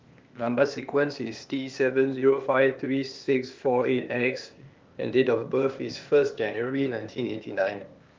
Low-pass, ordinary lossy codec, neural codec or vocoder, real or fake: 7.2 kHz; Opus, 32 kbps; codec, 16 kHz, 0.8 kbps, ZipCodec; fake